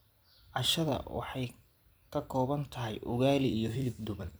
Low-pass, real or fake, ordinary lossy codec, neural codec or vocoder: none; fake; none; vocoder, 44.1 kHz, 128 mel bands every 256 samples, BigVGAN v2